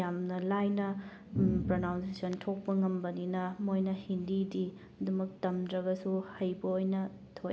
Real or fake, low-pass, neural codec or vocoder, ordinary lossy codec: real; none; none; none